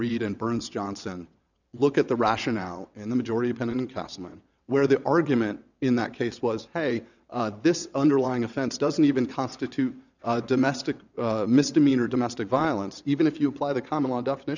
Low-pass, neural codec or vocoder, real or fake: 7.2 kHz; vocoder, 22.05 kHz, 80 mel bands, WaveNeXt; fake